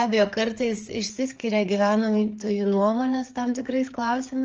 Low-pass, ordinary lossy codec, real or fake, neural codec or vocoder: 7.2 kHz; Opus, 24 kbps; fake; codec, 16 kHz, 4 kbps, FreqCodec, larger model